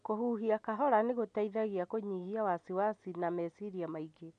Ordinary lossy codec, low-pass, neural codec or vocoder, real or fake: none; 9.9 kHz; none; real